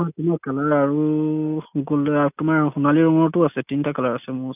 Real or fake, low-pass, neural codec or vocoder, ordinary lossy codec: real; 3.6 kHz; none; none